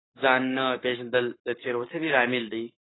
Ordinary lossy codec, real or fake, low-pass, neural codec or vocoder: AAC, 16 kbps; fake; 7.2 kHz; codec, 44.1 kHz, 7.8 kbps, Pupu-Codec